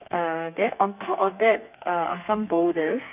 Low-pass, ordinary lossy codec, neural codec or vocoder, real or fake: 3.6 kHz; none; codec, 32 kHz, 1.9 kbps, SNAC; fake